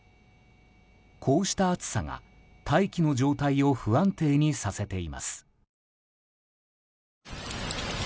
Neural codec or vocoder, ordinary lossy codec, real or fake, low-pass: none; none; real; none